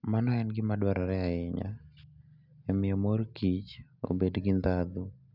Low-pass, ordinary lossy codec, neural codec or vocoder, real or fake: 5.4 kHz; none; none; real